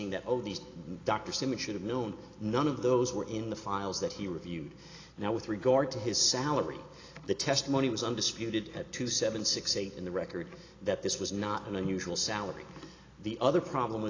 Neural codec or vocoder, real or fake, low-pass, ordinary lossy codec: none; real; 7.2 kHz; AAC, 32 kbps